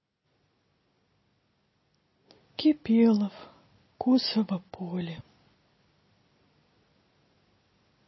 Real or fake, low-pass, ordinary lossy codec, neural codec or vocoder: real; 7.2 kHz; MP3, 24 kbps; none